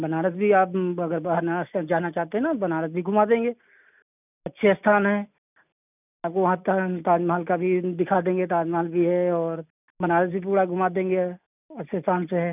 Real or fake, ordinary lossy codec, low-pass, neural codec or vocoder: real; none; 3.6 kHz; none